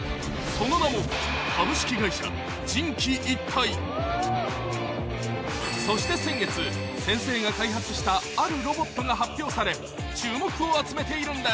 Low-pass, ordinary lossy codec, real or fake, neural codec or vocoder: none; none; real; none